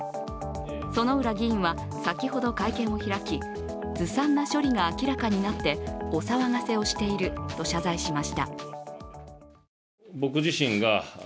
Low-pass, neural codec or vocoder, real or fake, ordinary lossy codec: none; none; real; none